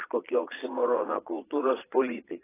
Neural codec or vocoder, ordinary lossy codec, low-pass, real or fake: vocoder, 22.05 kHz, 80 mel bands, Vocos; AAC, 16 kbps; 3.6 kHz; fake